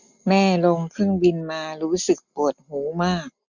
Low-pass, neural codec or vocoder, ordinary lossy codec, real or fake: 7.2 kHz; none; none; real